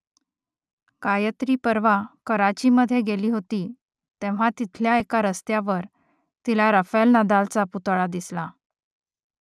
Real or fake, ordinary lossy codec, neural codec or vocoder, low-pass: real; none; none; none